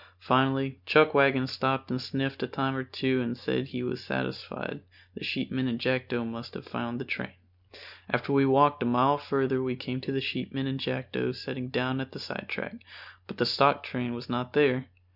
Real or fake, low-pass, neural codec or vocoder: real; 5.4 kHz; none